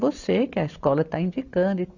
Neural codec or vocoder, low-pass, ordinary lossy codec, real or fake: none; 7.2 kHz; none; real